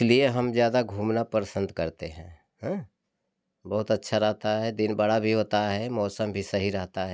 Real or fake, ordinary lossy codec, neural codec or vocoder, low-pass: real; none; none; none